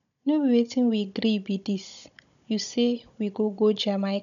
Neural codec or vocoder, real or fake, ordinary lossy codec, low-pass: codec, 16 kHz, 16 kbps, FunCodec, trained on Chinese and English, 50 frames a second; fake; none; 7.2 kHz